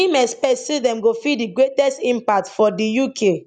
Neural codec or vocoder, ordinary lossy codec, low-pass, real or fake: none; none; 9.9 kHz; real